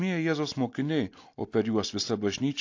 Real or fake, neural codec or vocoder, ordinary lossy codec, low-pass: real; none; AAC, 48 kbps; 7.2 kHz